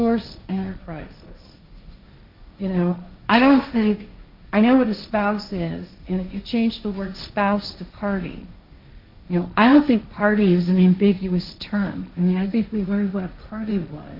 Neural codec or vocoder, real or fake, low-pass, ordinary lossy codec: codec, 16 kHz, 1.1 kbps, Voila-Tokenizer; fake; 5.4 kHz; MP3, 48 kbps